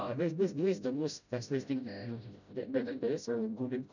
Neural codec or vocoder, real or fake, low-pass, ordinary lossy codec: codec, 16 kHz, 0.5 kbps, FreqCodec, smaller model; fake; 7.2 kHz; none